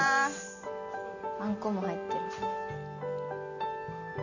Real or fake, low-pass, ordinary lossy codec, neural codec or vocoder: real; 7.2 kHz; none; none